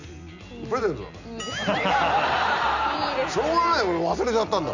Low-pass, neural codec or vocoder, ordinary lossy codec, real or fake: 7.2 kHz; none; none; real